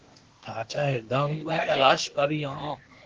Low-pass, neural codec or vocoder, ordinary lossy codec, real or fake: 7.2 kHz; codec, 16 kHz, 0.8 kbps, ZipCodec; Opus, 16 kbps; fake